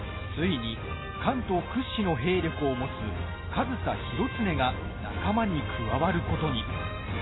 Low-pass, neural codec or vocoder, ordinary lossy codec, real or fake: 7.2 kHz; none; AAC, 16 kbps; real